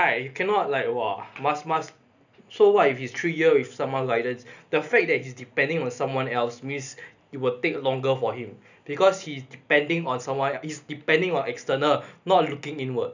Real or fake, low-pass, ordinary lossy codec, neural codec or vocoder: real; 7.2 kHz; none; none